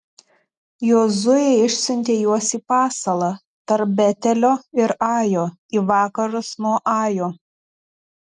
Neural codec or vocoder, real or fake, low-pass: none; real; 10.8 kHz